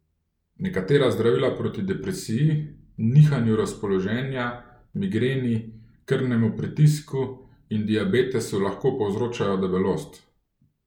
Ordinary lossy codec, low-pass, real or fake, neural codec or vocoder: none; 19.8 kHz; real; none